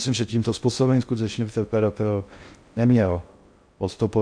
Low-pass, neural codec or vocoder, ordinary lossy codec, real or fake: 9.9 kHz; codec, 16 kHz in and 24 kHz out, 0.6 kbps, FocalCodec, streaming, 2048 codes; MP3, 64 kbps; fake